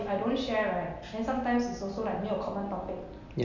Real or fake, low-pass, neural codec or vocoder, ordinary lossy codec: real; 7.2 kHz; none; none